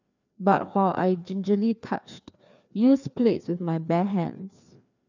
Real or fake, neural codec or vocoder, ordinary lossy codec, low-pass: fake; codec, 16 kHz, 2 kbps, FreqCodec, larger model; none; 7.2 kHz